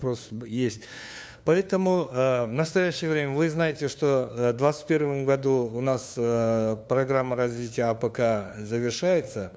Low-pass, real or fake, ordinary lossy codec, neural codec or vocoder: none; fake; none; codec, 16 kHz, 2 kbps, FunCodec, trained on LibriTTS, 25 frames a second